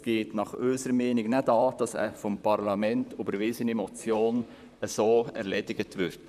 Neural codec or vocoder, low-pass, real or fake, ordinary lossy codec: vocoder, 44.1 kHz, 128 mel bands, Pupu-Vocoder; 14.4 kHz; fake; none